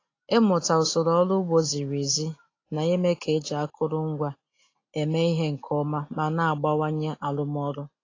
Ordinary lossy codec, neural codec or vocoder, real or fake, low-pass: AAC, 32 kbps; none; real; 7.2 kHz